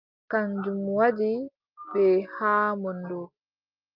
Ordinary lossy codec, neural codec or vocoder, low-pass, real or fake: Opus, 24 kbps; none; 5.4 kHz; real